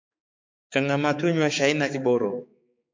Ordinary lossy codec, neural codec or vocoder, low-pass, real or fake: MP3, 48 kbps; codec, 16 kHz, 4 kbps, X-Codec, HuBERT features, trained on balanced general audio; 7.2 kHz; fake